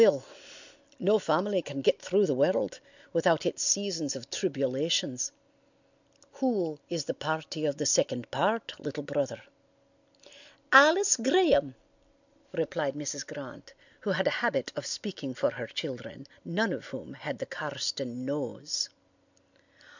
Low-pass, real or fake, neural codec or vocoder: 7.2 kHz; real; none